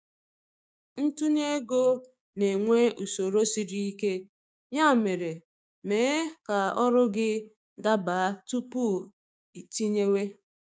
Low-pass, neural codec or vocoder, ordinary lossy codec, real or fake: none; codec, 16 kHz, 6 kbps, DAC; none; fake